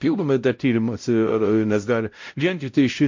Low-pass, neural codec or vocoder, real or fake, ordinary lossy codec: 7.2 kHz; codec, 16 kHz, 0.5 kbps, X-Codec, WavLM features, trained on Multilingual LibriSpeech; fake; MP3, 48 kbps